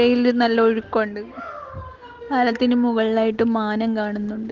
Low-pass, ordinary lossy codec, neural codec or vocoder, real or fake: 7.2 kHz; Opus, 16 kbps; none; real